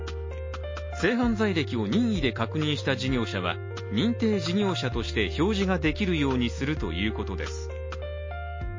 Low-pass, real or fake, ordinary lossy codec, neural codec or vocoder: 7.2 kHz; real; MP3, 32 kbps; none